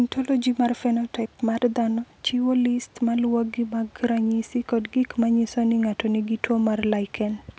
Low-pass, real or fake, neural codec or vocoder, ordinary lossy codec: none; real; none; none